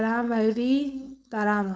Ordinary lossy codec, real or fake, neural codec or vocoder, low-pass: none; fake; codec, 16 kHz, 4.8 kbps, FACodec; none